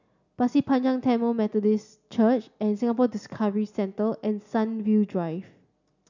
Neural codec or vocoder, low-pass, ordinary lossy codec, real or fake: none; 7.2 kHz; none; real